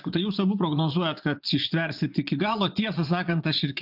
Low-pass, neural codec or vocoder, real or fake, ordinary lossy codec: 5.4 kHz; vocoder, 24 kHz, 100 mel bands, Vocos; fake; AAC, 48 kbps